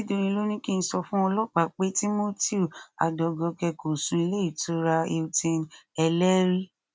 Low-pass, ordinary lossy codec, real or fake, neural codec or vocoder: none; none; real; none